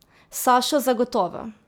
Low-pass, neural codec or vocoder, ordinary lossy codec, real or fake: none; none; none; real